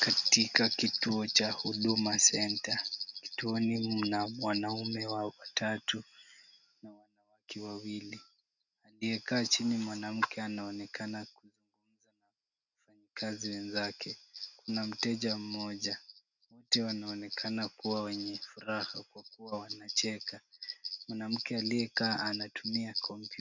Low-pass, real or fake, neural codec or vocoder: 7.2 kHz; real; none